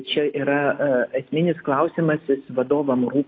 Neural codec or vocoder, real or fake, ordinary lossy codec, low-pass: none; real; AAC, 32 kbps; 7.2 kHz